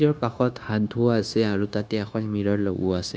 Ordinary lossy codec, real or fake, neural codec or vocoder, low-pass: none; fake; codec, 16 kHz, 0.9 kbps, LongCat-Audio-Codec; none